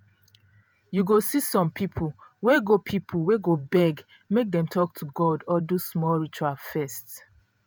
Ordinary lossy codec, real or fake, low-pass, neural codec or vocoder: none; fake; none; vocoder, 48 kHz, 128 mel bands, Vocos